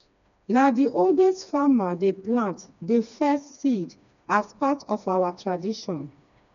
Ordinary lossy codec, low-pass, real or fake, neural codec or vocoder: none; 7.2 kHz; fake; codec, 16 kHz, 2 kbps, FreqCodec, smaller model